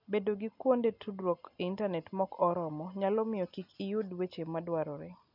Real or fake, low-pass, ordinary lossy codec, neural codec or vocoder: real; 5.4 kHz; none; none